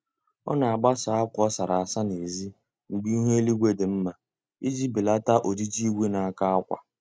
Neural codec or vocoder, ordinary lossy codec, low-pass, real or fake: none; none; none; real